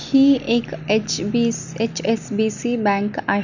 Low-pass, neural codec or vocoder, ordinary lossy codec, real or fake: 7.2 kHz; none; none; real